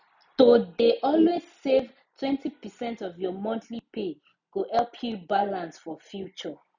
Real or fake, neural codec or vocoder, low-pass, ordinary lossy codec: real; none; 7.2 kHz; none